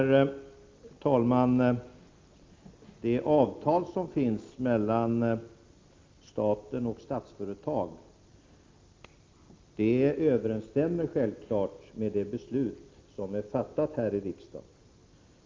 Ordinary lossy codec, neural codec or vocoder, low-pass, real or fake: Opus, 24 kbps; none; 7.2 kHz; real